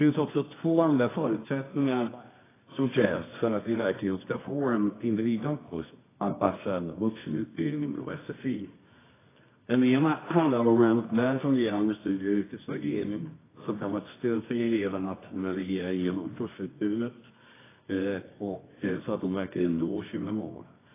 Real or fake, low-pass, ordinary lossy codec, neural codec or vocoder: fake; 3.6 kHz; AAC, 16 kbps; codec, 24 kHz, 0.9 kbps, WavTokenizer, medium music audio release